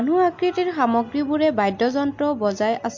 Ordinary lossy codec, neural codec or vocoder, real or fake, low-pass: MP3, 48 kbps; none; real; 7.2 kHz